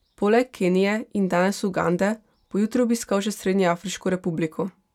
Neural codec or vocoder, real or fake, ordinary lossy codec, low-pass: none; real; none; 19.8 kHz